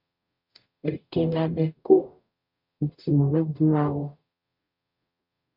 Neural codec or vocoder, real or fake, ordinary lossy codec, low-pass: codec, 44.1 kHz, 0.9 kbps, DAC; fake; MP3, 48 kbps; 5.4 kHz